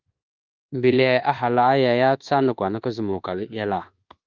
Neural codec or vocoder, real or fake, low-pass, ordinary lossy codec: codec, 24 kHz, 1.2 kbps, DualCodec; fake; 7.2 kHz; Opus, 32 kbps